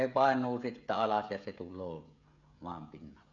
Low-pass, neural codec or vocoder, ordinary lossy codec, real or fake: 7.2 kHz; codec, 16 kHz, 16 kbps, FreqCodec, smaller model; none; fake